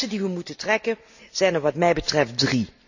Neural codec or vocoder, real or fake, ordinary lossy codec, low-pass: none; real; none; 7.2 kHz